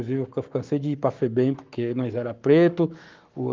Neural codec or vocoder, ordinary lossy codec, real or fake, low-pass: codec, 44.1 kHz, 7.8 kbps, Pupu-Codec; Opus, 32 kbps; fake; 7.2 kHz